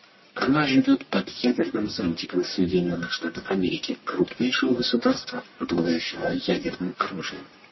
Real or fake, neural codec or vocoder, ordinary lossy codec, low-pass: fake; codec, 44.1 kHz, 1.7 kbps, Pupu-Codec; MP3, 24 kbps; 7.2 kHz